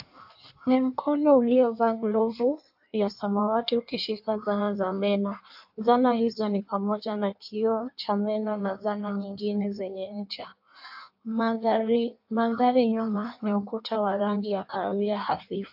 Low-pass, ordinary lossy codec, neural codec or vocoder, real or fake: 5.4 kHz; AAC, 48 kbps; codec, 16 kHz in and 24 kHz out, 1.1 kbps, FireRedTTS-2 codec; fake